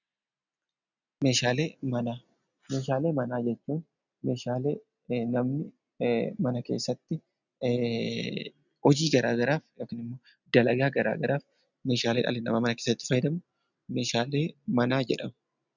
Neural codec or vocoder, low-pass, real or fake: none; 7.2 kHz; real